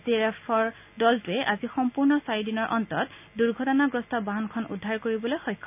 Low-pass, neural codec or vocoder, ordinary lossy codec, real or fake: 3.6 kHz; none; none; real